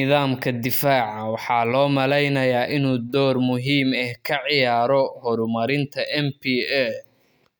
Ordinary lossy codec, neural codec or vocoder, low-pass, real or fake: none; none; none; real